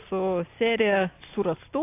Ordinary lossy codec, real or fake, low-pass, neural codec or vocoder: AAC, 24 kbps; real; 3.6 kHz; none